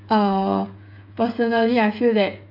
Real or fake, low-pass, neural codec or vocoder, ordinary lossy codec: fake; 5.4 kHz; codec, 16 kHz, 8 kbps, FreqCodec, smaller model; none